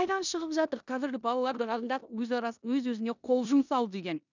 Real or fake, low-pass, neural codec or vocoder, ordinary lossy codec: fake; 7.2 kHz; codec, 16 kHz in and 24 kHz out, 0.9 kbps, LongCat-Audio-Codec, four codebook decoder; none